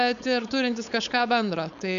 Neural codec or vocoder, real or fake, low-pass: codec, 16 kHz, 4.8 kbps, FACodec; fake; 7.2 kHz